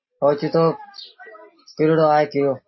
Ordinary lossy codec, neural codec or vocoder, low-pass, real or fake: MP3, 24 kbps; none; 7.2 kHz; real